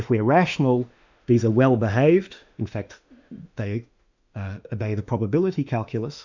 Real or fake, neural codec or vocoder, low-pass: fake; autoencoder, 48 kHz, 32 numbers a frame, DAC-VAE, trained on Japanese speech; 7.2 kHz